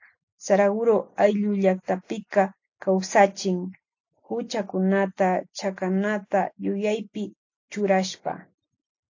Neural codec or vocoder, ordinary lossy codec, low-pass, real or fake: none; AAC, 48 kbps; 7.2 kHz; real